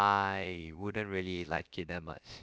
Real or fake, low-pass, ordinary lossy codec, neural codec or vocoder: fake; none; none; codec, 16 kHz, about 1 kbps, DyCAST, with the encoder's durations